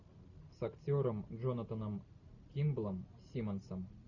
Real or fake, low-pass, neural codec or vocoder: real; 7.2 kHz; none